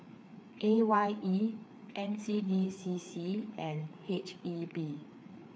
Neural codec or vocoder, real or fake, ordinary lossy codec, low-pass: codec, 16 kHz, 4 kbps, FreqCodec, larger model; fake; none; none